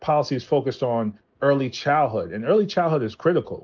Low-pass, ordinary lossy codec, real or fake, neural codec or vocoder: 7.2 kHz; Opus, 24 kbps; real; none